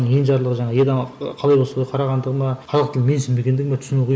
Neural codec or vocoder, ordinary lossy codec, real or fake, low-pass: none; none; real; none